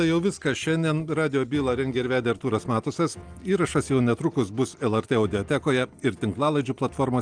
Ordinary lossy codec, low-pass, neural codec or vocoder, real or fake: Opus, 32 kbps; 9.9 kHz; none; real